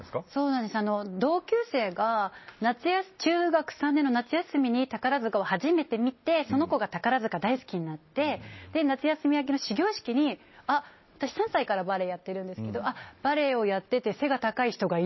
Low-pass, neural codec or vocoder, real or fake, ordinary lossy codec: 7.2 kHz; none; real; MP3, 24 kbps